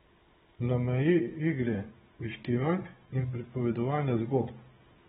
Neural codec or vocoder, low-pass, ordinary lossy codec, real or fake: codec, 16 kHz, 16 kbps, FunCodec, trained on Chinese and English, 50 frames a second; 7.2 kHz; AAC, 16 kbps; fake